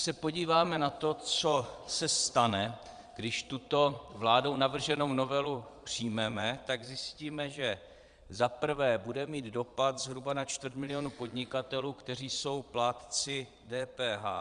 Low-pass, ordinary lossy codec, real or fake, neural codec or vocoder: 9.9 kHz; Opus, 64 kbps; fake; vocoder, 22.05 kHz, 80 mel bands, Vocos